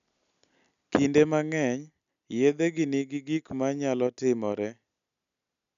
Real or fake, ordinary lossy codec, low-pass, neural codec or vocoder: real; none; 7.2 kHz; none